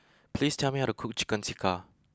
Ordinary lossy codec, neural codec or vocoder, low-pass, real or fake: none; none; none; real